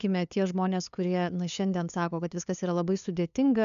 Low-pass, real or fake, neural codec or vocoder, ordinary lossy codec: 7.2 kHz; fake; codec, 16 kHz, 2 kbps, FunCodec, trained on LibriTTS, 25 frames a second; MP3, 96 kbps